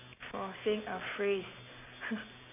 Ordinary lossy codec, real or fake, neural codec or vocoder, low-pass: AAC, 24 kbps; real; none; 3.6 kHz